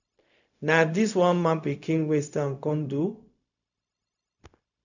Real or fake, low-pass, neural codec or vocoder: fake; 7.2 kHz; codec, 16 kHz, 0.4 kbps, LongCat-Audio-Codec